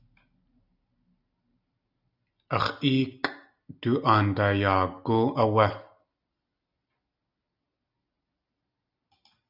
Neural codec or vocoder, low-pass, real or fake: none; 5.4 kHz; real